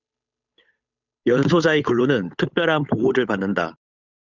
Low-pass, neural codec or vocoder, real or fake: 7.2 kHz; codec, 16 kHz, 8 kbps, FunCodec, trained on Chinese and English, 25 frames a second; fake